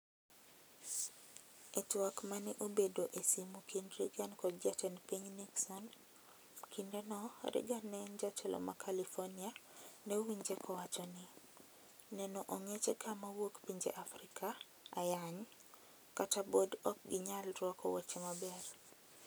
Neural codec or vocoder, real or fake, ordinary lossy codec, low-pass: none; real; none; none